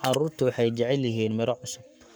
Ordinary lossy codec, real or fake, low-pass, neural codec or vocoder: none; fake; none; codec, 44.1 kHz, 7.8 kbps, Pupu-Codec